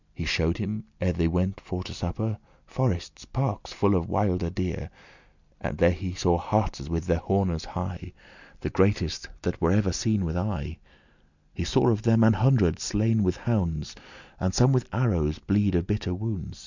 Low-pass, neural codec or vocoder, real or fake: 7.2 kHz; none; real